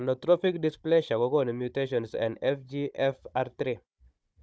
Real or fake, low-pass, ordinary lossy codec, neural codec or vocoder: fake; none; none; codec, 16 kHz, 16 kbps, FunCodec, trained on Chinese and English, 50 frames a second